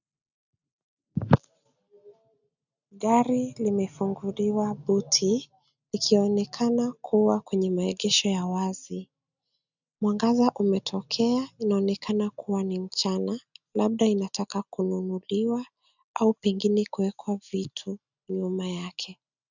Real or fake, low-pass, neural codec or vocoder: real; 7.2 kHz; none